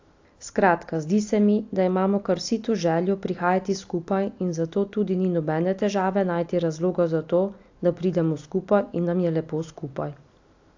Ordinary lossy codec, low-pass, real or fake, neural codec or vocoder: AAC, 48 kbps; 7.2 kHz; real; none